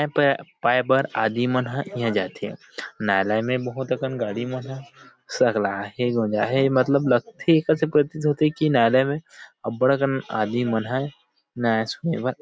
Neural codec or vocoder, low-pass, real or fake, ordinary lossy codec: none; none; real; none